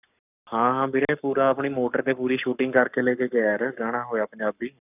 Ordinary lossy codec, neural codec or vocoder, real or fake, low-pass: none; codec, 44.1 kHz, 7.8 kbps, Pupu-Codec; fake; 3.6 kHz